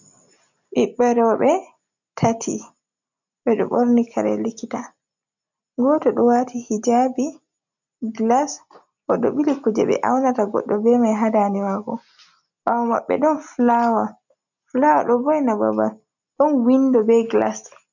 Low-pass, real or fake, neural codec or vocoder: 7.2 kHz; real; none